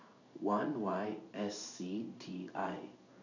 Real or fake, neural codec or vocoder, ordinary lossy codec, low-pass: fake; codec, 16 kHz in and 24 kHz out, 1 kbps, XY-Tokenizer; none; 7.2 kHz